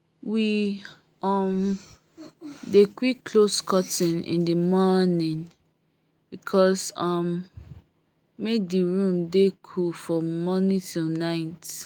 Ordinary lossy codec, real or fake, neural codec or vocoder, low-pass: Opus, 32 kbps; fake; autoencoder, 48 kHz, 128 numbers a frame, DAC-VAE, trained on Japanese speech; 19.8 kHz